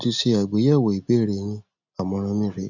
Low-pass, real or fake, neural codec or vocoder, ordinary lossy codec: 7.2 kHz; real; none; none